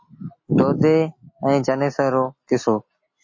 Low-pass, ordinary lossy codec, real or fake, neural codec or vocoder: 7.2 kHz; MP3, 32 kbps; real; none